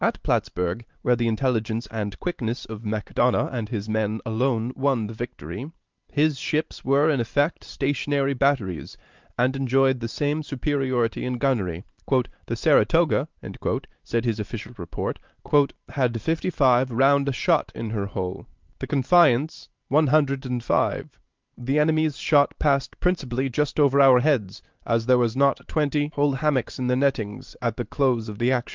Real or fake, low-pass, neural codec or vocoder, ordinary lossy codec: fake; 7.2 kHz; vocoder, 44.1 kHz, 128 mel bands every 512 samples, BigVGAN v2; Opus, 32 kbps